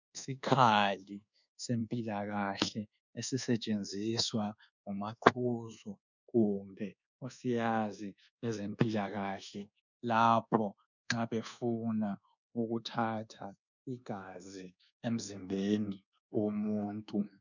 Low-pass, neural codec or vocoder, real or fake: 7.2 kHz; codec, 24 kHz, 1.2 kbps, DualCodec; fake